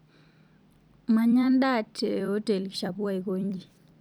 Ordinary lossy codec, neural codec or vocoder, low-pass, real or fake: none; vocoder, 44.1 kHz, 128 mel bands every 256 samples, BigVGAN v2; 19.8 kHz; fake